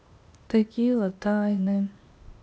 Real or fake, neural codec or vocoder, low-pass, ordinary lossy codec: fake; codec, 16 kHz, 0.8 kbps, ZipCodec; none; none